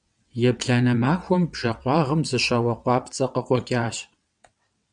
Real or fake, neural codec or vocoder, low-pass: fake; vocoder, 22.05 kHz, 80 mel bands, WaveNeXt; 9.9 kHz